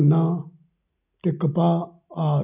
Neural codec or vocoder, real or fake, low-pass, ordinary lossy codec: none; real; 3.6 kHz; none